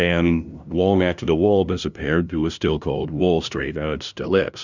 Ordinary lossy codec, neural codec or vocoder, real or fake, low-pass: Opus, 64 kbps; codec, 16 kHz, 0.5 kbps, FunCodec, trained on LibriTTS, 25 frames a second; fake; 7.2 kHz